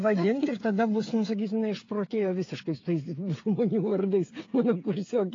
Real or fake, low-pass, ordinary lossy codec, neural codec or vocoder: fake; 7.2 kHz; AAC, 32 kbps; codec, 16 kHz, 8 kbps, FreqCodec, smaller model